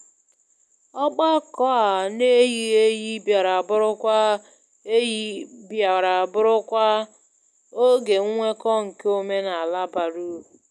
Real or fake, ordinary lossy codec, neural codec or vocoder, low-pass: real; none; none; none